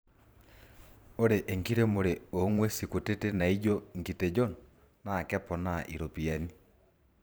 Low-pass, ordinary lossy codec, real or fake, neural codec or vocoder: none; none; fake; vocoder, 44.1 kHz, 128 mel bands every 512 samples, BigVGAN v2